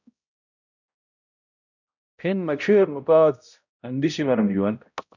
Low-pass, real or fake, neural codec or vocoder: 7.2 kHz; fake; codec, 16 kHz, 0.5 kbps, X-Codec, HuBERT features, trained on balanced general audio